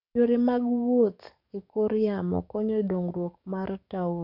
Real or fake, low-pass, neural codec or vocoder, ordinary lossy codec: fake; 5.4 kHz; codec, 44.1 kHz, 7.8 kbps, DAC; none